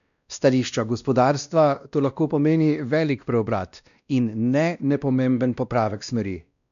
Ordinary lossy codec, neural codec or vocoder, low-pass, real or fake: none; codec, 16 kHz, 1 kbps, X-Codec, WavLM features, trained on Multilingual LibriSpeech; 7.2 kHz; fake